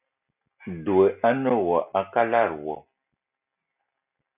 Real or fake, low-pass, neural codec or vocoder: real; 3.6 kHz; none